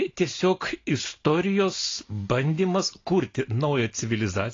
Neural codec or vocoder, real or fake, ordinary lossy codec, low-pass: none; real; AAC, 32 kbps; 7.2 kHz